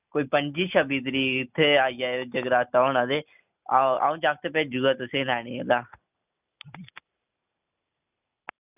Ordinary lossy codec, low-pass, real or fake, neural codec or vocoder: none; 3.6 kHz; real; none